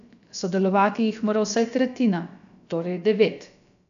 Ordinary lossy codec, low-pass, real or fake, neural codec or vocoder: MP3, 96 kbps; 7.2 kHz; fake; codec, 16 kHz, about 1 kbps, DyCAST, with the encoder's durations